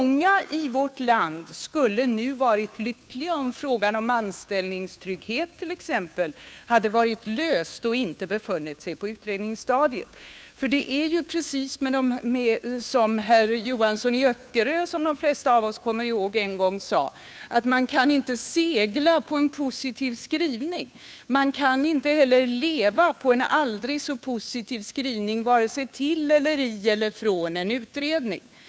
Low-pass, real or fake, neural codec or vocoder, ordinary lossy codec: none; fake; codec, 16 kHz, 2 kbps, FunCodec, trained on Chinese and English, 25 frames a second; none